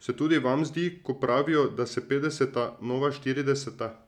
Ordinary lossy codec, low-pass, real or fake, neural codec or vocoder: none; 19.8 kHz; real; none